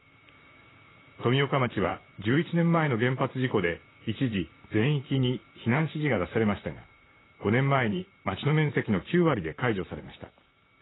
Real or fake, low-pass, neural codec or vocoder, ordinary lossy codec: fake; 7.2 kHz; vocoder, 44.1 kHz, 128 mel bands, Pupu-Vocoder; AAC, 16 kbps